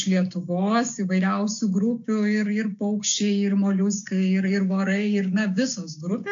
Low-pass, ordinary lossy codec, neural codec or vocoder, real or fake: 7.2 kHz; AAC, 64 kbps; codec, 16 kHz, 6 kbps, DAC; fake